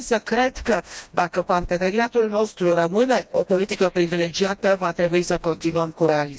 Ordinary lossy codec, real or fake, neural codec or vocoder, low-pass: none; fake; codec, 16 kHz, 1 kbps, FreqCodec, smaller model; none